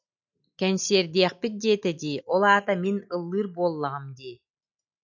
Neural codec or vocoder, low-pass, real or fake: none; 7.2 kHz; real